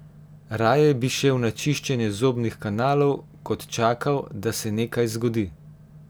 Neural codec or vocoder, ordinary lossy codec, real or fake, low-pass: none; none; real; none